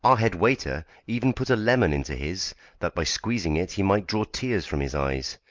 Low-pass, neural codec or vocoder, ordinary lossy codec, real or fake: 7.2 kHz; none; Opus, 24 kbps; real